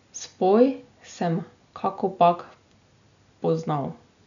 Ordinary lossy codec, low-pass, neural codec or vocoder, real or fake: none; 7.2 kHz; none; real